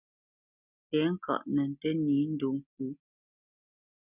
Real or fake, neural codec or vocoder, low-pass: real; none; 3.6 kHz